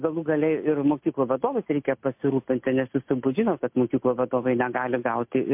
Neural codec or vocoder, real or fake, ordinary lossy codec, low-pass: none; real; MP3, 32 kbps; 3.6 kHz